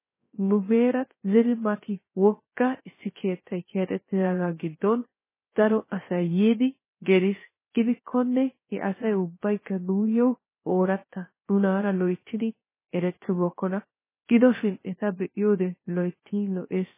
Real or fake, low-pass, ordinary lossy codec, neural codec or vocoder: fake; 3.6 kHz; MP3, 16 kbps; codec, 16 kHz, 0.3 kbps, FocalCodec